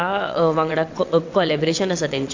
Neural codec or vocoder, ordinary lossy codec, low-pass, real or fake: vocoder, 22.05 kHz, 80 mel bands, WaveNeXt; AAC, 48 kbps; 7.2 kHz; fake